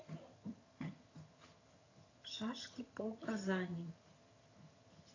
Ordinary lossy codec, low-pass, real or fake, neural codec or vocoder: AAC, 32 kbps; 7.2 kHz; fake; vocoder, 22.05 kHz, 80 mel bands, HiFi-GAN